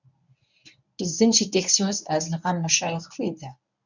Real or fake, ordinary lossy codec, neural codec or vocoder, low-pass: fake; none; codec, 24 kHz, 0.9 kbps, WavTokenizer, medium speech release version 1; 7.2 kHz